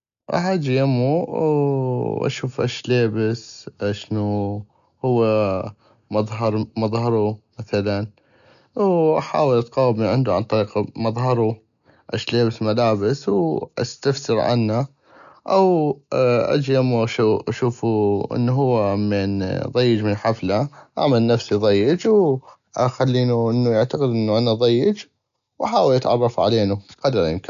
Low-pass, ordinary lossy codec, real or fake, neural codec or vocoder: 7.2 kHz; none; real; none